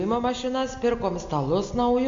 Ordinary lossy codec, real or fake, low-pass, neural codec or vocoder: MP3, 48 kbps; real; 7.2 kHz; none